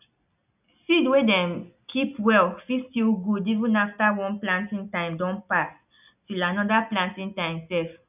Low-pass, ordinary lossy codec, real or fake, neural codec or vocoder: 3.6 kHz; none; real; none